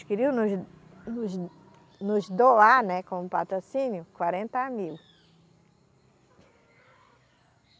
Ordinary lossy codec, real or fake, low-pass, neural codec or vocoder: none; real; none; none